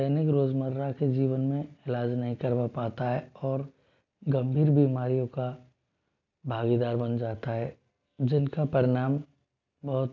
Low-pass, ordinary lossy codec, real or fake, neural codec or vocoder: 7.2 kHz; none; real; none